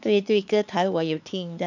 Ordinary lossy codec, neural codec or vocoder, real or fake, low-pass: none; codec, 16 kHz, 4 kbps, X-Codec, HuBERT features, trained on LibriSpeech; fake; 7.2 kHz